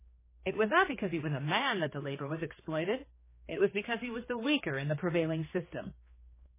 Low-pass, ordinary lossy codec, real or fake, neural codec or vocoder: 3.6 kHz; MP3, 16 kbps; fake; codec, 16 kHz, 2 kbps, X-Codec, HuBERT features, trained on general audio